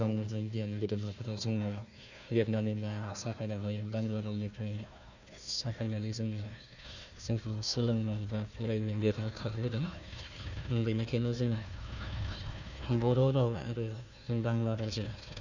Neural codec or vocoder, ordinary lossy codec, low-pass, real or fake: codec, 16 kHz, 1 kbps, FunCodec, trained on Chinese and English, 50 frames a second; none; 7.2 kHz; fake